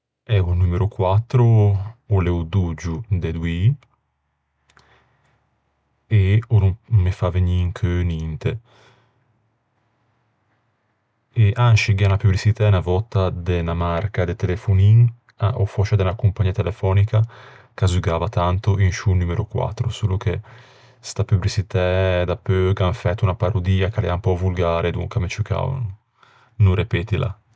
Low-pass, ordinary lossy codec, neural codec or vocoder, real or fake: none; none; none; real